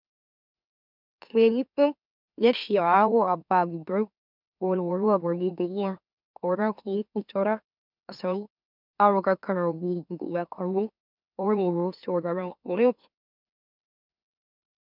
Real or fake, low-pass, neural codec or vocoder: fake; 5.4 kHz; autoencoder, 44.1 kHz, a latent of 192 numbers a frame, MeloTTS